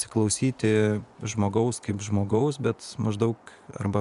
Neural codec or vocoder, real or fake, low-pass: vocoder, 24 kHz, 100 mel bands, Vocos; fake; 10.8 kHz